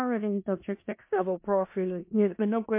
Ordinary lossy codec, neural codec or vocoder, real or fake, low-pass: MP3, 24 kbps; codec, 16 kHz in and 24 kHz out, 0.4 kbps, LongCat-Audio-Codec, four codebook decoder; fake; 3.6 kHz